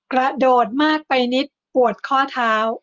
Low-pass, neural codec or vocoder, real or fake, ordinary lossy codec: 7.2 kHz; none; real; Opus, 32 kbps